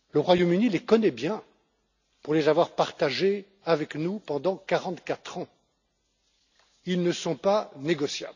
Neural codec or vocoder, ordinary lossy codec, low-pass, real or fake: none; none; 7.2 kHz; real